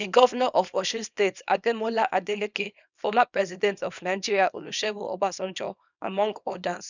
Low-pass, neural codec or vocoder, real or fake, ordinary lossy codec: 7.2 kHz; codec, 24 kHz, 0.9 kbps, WavTokenizer, small release; fake; none